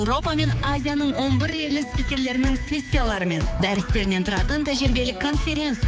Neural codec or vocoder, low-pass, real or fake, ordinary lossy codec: codec, 16 kHz, 4 kbps, X-Codec, HuBERT features, trained on general audio; none; fake; none